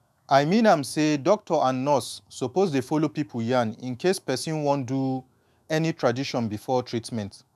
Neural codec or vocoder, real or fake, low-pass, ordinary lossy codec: autoencoder, 48 kHz, 128 numbers a frame, DAC-VAE, trained on Japanese speech; fake; 14.4 kHz; none